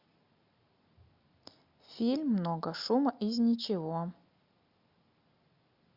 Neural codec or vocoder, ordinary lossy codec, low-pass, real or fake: none; Opus, 64 kbps; 5.4 kHz; real